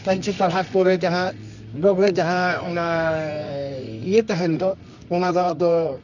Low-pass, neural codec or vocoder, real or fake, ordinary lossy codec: 7.2 kHz; codec, 24 kHz, 0.9 kbps, WavTokenizer, medium music audio release; fake; none